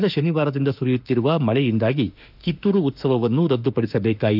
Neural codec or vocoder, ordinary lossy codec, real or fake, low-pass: autoencoder, 48 kHz, 32 numbers a frame, DAC-VAE, trained on Japanese speech; none; fake; 5.4 kHz